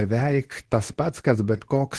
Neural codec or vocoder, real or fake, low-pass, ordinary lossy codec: codec, 24 kHz, 0.9 kbps, WavTokenizer, medium speech release version 2; fake; 10.8 kHz; Opus, 16 kbps